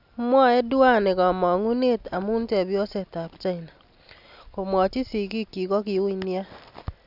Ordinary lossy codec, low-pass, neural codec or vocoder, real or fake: none; 5.4 kHz; none; real